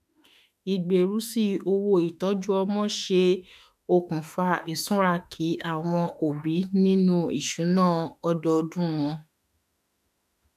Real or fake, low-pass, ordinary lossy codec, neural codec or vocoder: fake; 14.4 kHz; none; autoencoder, 48 kHz, 32 numbers a frame, DAC-VAE, trained on Japanese speech